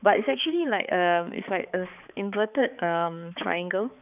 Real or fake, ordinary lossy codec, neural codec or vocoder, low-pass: fake; none; codec, 16 kHz, 4 kbps, X-Codec, HuBERT features, trained on balanced general audio; 3.6 kHz